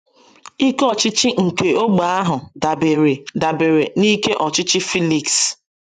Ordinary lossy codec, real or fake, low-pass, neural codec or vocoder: none; fake; 10.8 kHz; vocoder, 24 kHz, 100 mel bands, Vocos